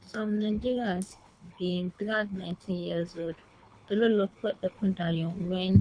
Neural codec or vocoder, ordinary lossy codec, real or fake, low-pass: codec, 24 kHz, 3 kbps, HILCodec; none; fake; 9.9 kHz